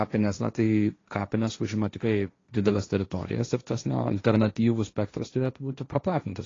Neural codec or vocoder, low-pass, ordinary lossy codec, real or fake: codec, 16 kHz, 1.1 kbps, Voila-Tokenizer; 7.2 kHz; AAC, 32 kbps; fake